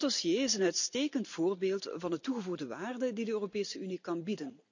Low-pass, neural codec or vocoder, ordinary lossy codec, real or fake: 7.2 kHz; none; none; real